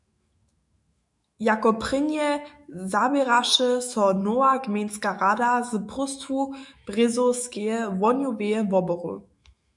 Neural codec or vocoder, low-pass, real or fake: autoencoder, 48 kHz, 128 numbers a frame, DAC-VAE, trained on Japanese speech; 10.8 kHz; fake